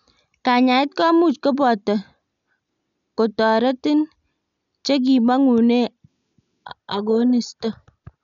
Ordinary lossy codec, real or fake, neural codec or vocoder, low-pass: none; real; none; 7.2 kHz